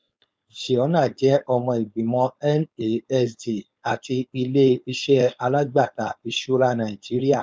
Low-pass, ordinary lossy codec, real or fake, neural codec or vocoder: none; none; fake; codec, 16 kHz, 4.8 kbps, FACodec